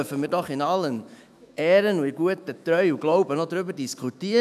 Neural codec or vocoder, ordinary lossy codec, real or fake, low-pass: autoencoder, 48 kHz, 128 numbers a frame, DAC-VAE, trained on Japanese speech; none; fake; 14.4 kHz